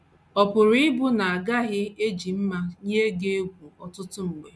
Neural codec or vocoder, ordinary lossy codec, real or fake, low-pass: none; none; real; none